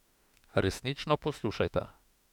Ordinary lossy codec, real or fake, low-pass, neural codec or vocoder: none; fake; 19.8 kHz; autoencoder, 48 kHz, 32 numbers a frame, DAC-VAE, trained on Japanese speech